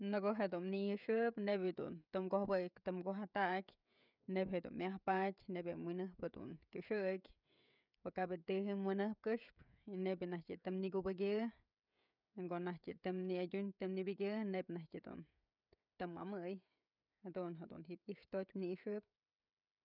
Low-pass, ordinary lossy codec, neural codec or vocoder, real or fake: 5.4 kHz; none; codec, 16 kHz, 16 kbps, FreqCodec, smaller model; fake